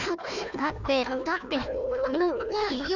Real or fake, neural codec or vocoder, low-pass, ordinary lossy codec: fake; codec, 16 kHz, 1 kbps, FunCodec, trained on Chinese and English, 50 frames a second; 7.2 kHz; none